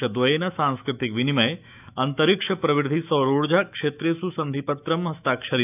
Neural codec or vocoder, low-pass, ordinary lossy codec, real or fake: autoencoder, 48 kHz, 128 numbers a frame, DAC-VAE, trained on Japanese speech; 3.6 kHz; none; fake